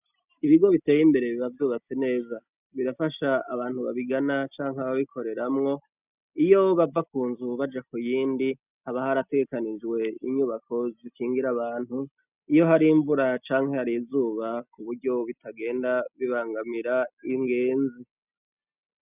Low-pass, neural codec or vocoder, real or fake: 3.6 kHz; none; real